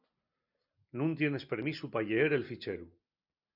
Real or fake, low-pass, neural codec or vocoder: real; 5.4 kHz; none